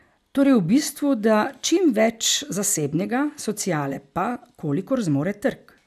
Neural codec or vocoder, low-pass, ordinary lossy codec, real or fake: none; 14.4 kHz; none; real